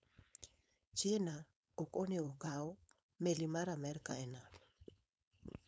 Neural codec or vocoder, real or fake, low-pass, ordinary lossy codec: codec, 16 kHz, 4.8 kbps, FACodec; fake; none; none